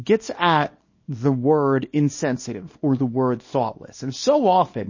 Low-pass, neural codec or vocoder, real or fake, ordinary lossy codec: 7.2 kHz; codec, 24 kHz, 0.9 kbps, WavTokenizer, small release; fake; MP3, 32 kbps